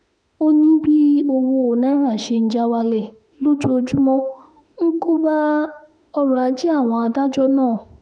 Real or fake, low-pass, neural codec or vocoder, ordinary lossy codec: fake; 9.9 kHz; autoencoder, 48 kHz, 32 numbers a frame, DAC-VAE, trained on Japanese speech; none